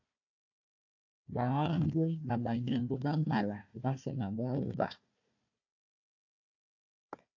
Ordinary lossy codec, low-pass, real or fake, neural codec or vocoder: AAC, 48 kbps; 7.2 kHz; fake; codec, 16 kHz, 1 kbps, FunCodec, trained on Chinese and English, 50 frames a second